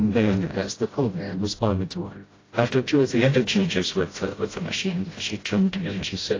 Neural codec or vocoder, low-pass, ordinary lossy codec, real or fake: codec, 16 kHz, 0.5 kbps, FreqCodec, smaller model; 7.2 kHz; AAC, 32 kbps; fake